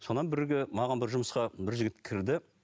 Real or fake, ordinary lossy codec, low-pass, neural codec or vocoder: real; none; none; none